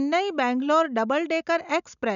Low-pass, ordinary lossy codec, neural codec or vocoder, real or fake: 7.2 kHz; none; none; real